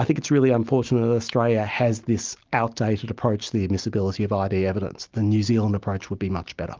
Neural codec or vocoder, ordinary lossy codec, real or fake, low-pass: none; Opus, 24 kbps; real; 7.2 kHz